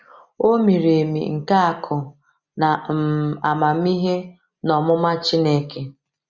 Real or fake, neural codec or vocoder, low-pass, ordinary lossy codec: real; none; 7.2 kHz; Opus, 64 kbps